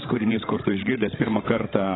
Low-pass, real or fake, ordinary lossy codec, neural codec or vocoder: 7.2 kHz; fake; AAC, 16 kbps; codec, 16 kHz, 16 kbps, FreqCodec, larger model